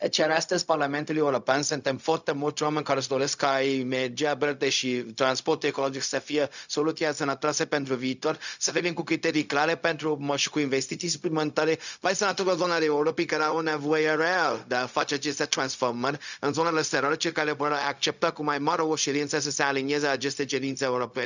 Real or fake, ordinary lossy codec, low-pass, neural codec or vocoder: fake; none; 7.2 kHz; codec, 16 kHz, 0.4 kbps, LongCat-Audio-Codec